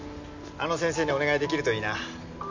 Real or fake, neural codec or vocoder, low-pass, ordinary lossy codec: real; none; 7.2 kHz; MP3, 64 kbps